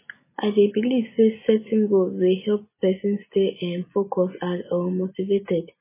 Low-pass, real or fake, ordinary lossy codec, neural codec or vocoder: 3.6 kHz; real; MP3, 16 kbps; none